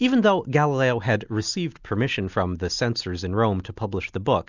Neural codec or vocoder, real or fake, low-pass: none; real; 7.2 kHz